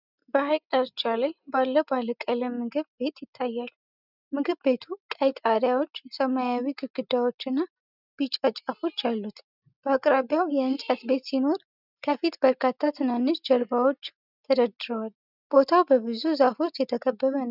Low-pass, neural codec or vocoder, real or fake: 5.4 kHz; none; real